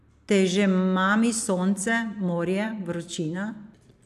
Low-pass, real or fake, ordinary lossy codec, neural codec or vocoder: 14.4 kHz; fake; none; vocoder, 44.1 kHz, 128 mel bands every 256 samples, BigVGAN v2